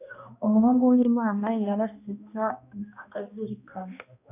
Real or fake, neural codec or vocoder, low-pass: fake; codec, 16 kHz, 1 kbps, X-Codec, HuBERT features, trained on balanced general audio; 3.6 kHz